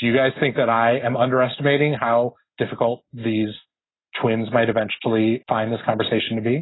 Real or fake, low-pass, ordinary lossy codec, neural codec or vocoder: real; 7.2 kHz; AAC, 16 kbps; none